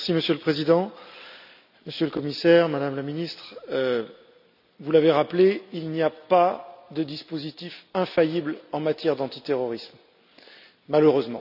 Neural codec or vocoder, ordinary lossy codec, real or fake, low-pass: none; none; real; 5.4 kHz